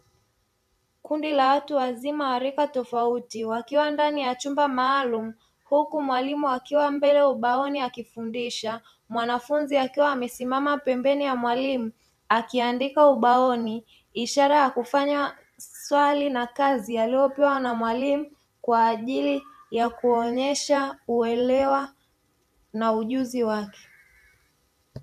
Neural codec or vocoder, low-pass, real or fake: vocoder, 44.1 kHz, 128 mel bands every 512 samples, BigVGAN v2; 14.4 kHz; fake